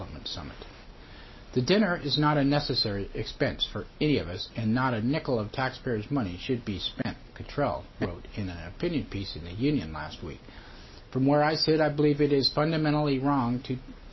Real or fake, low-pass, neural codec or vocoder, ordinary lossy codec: real; 7.2 kHz; none; MP3, 24 kbps